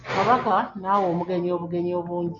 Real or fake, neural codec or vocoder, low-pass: real; none; 7.2 kHz